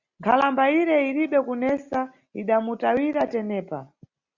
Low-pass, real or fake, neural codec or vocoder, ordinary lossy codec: 7.2 kHz; real; none; Opus, 64 kbps